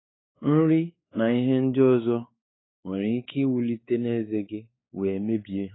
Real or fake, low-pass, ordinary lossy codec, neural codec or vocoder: fake; 7.2 kHz; AAC, 16 kbps; codec, 16 kHz, 4 kbps, X-Codec, WavLM features, trained on Multilingual LibriSpeech